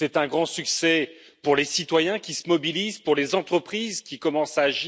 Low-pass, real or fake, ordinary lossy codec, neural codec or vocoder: none; real; none; none